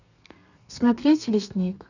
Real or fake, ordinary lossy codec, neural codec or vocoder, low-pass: fake; none; codec, 32 kHz, 1.9 kbps, SNAC; 7.2 kHz